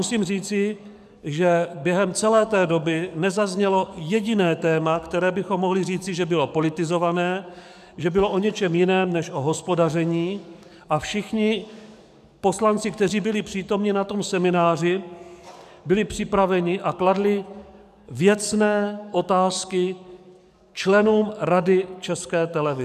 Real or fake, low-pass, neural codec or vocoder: fake; 14.4 kHz; codec, 44.1 kHz, 7.8 kbps, DAC